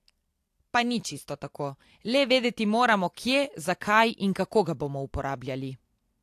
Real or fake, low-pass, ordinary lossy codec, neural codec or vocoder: real; 14.4 kHz; AAC, 64 kbps; none